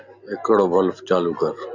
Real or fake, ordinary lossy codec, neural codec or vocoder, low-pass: real; Opus, 64 kbps; none; 7.2 kHz